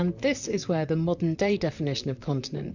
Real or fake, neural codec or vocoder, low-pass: fake; vocoder, 44.1 kHz, 128 mel bands, Pupu-Vocoder; 7.2 kHz